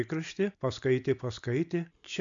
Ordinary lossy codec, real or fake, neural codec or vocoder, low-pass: MP3, 64 kbps; real; none; 7.2 kHz